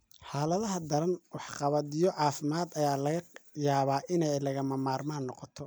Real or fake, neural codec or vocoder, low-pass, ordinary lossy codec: real; none; none; none